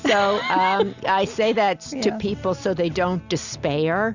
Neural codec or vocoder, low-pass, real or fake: none; 7.2 kHz; real